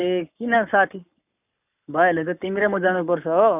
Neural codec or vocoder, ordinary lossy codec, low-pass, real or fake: codec, 44.1 kHz, 7.8 kbps, Pupu-Codec; none; 3.6 kHz; fake